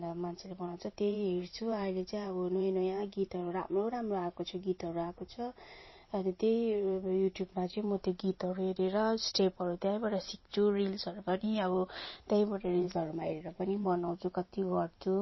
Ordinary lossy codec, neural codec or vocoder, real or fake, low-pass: MP3, 24 kbps; vocoder, 44.1 kHz, 128 mel bands every 512 samples, BigVGAN v2; fake; 7.2 kHz